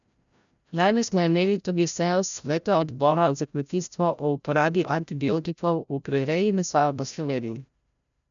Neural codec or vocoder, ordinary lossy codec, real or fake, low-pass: codec, 16 kHz, 0.5 kbps, FreqCodec, larger model; none; fake; 7.2 kHz